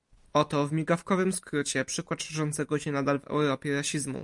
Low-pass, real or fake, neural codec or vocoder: 10.8 kHz; real; none